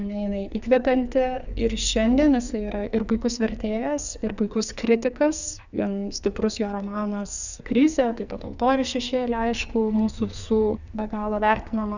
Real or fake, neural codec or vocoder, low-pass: fake; codec, 44.1 kHz, 2.6 kbps, SNAC; 7.2 kHz